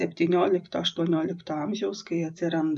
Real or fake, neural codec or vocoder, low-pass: fake; vocoder, 44.1 kHz, 128 mel bands every 256 samples, BigVGAN v2; 10.8 kHz